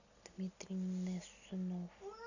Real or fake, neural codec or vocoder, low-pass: real; none; 7.2 kHz